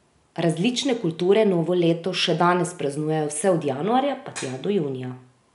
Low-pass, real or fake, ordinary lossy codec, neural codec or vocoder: 10.8 kHz; real; none; none